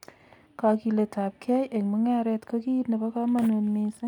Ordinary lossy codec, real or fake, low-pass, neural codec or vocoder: none; real; 19.8 kHz; none